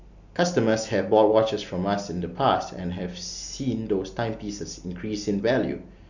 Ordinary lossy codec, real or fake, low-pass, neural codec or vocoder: none; real; 7.2 kHz; none